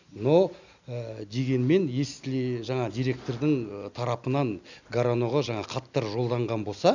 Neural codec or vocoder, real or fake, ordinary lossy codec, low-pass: none; real; none; 7.2 kHz